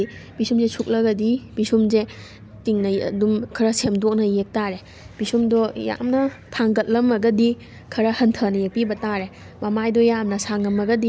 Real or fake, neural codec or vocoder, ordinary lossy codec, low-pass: real; none; none; none